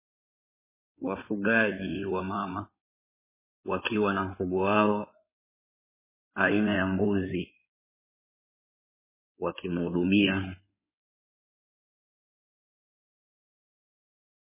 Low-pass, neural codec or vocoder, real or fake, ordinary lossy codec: 3.6 kHz; codec, 16 kHz in and 24 kHz out, 2.2 kbps, FireRedTTS-2 codec; fake; MP3, 16 kbps